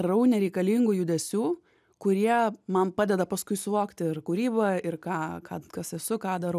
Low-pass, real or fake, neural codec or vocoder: 14.4 kHz; real; none